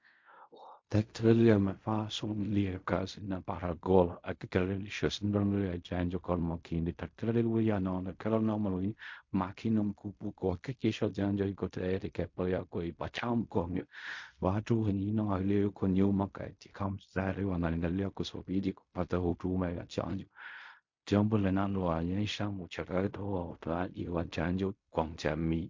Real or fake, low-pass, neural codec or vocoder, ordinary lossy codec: fake; 7.2 kHz; codec, 16 kHz in and 24 kHz out, 0.4 kbps, LongCat-Audio-Codec, fine tuned four codebook decoder; MP3, 48 kbps